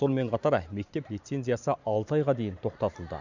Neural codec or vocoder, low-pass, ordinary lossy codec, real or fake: vocoder, 44.1 kHz, 128 mel bands every 512 samples, BigVGAN v2; 7.2 kHz; none; fake